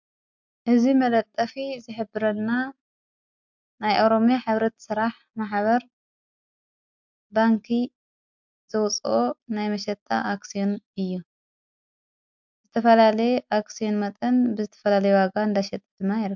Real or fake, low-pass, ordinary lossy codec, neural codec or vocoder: real; 7.2 kHz; MP3, 64 kbps; none